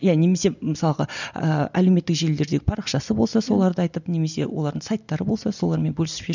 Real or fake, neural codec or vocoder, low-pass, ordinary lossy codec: real; none; 7.2 kHz; none